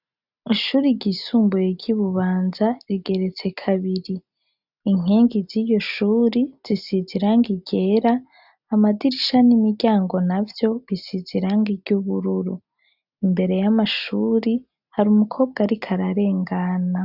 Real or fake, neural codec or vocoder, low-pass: real; none; 5.4 kHz